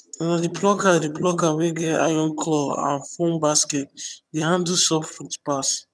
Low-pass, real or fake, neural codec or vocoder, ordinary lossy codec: none; fake; vocoder, 22.05 kHz, 80 mel bands, HiFi-GAN; none